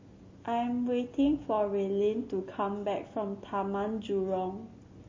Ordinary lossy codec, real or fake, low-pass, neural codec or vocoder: MP3, 32 kbps; real; 7.2 kHz; none